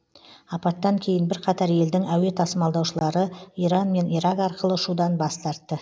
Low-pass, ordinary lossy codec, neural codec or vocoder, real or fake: none; none; none; real